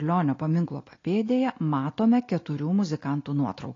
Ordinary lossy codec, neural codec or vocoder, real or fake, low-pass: AAC, 32 kbps; none; real; 7.2 kHz